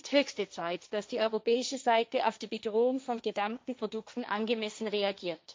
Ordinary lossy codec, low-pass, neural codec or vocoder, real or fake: none; none; codec, 16 kHz, 1.1 kbps, Voila-Tokenizer; fake